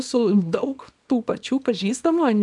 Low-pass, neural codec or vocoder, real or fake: 10.8 kHz; codec, 24 kHz, 0.9 kbps, WavTokenizer, small release; fake